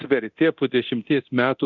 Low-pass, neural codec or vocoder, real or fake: 7.2 kHz; codec, 24 kHz, 0.9 kbps, DualCodec; fake